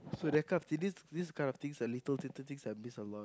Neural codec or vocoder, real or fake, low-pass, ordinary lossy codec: none; real; none; none